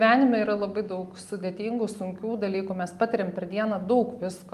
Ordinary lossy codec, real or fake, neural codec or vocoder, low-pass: Opus, 32 kbps; real; none; 14.4 kHz